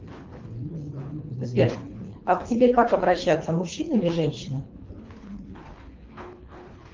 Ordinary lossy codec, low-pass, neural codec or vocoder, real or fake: Opus, 32 kbps; 7.2 kHz; codec, 24 kHz, 3 kbps, HILCodec; fake